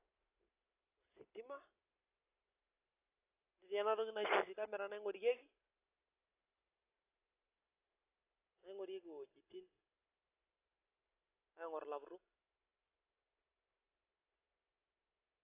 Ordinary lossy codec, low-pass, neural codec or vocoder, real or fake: AAC, 24 kbps; 3.6 kHz; none; real